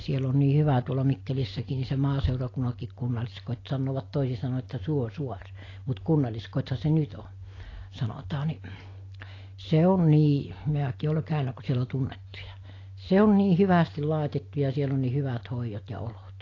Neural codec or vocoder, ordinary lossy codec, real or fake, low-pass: none; AAC, 32 kbps; real; 7.2 kHz